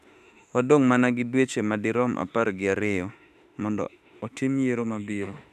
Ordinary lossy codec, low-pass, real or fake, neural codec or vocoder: none; 14.4 kHz; fake; autoencoder, 48 kHz, 32 numbers a frame, DAC-VAE, trained on Japanese speech